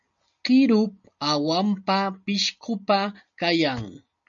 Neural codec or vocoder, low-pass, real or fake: none; 7.2 kHz; real